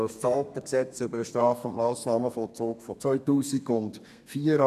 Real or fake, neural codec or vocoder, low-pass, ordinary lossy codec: fake; codec, 32 kHz, 1.9 kbps, SNAC; 14.4 kHz; none